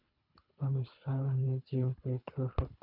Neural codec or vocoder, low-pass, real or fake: codec, 24 kHz, 3 kbps, HILCodec; 5.4 kHz; fake